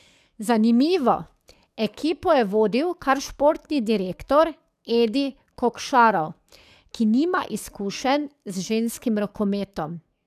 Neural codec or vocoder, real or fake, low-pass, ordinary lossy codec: codec, 44.1 kHz, 7.8 kbps, DAC; fake; 14.4 kHz; none